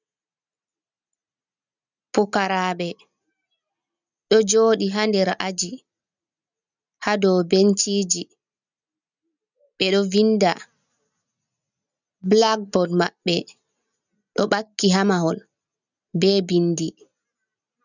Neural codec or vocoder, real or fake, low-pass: none; real; 7.2 kHz